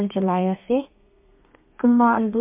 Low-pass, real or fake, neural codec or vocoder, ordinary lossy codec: 3.6 kHz; fake; codec, 32 kHz, 1.9 kbps, SNAC; MP3, 32 kbps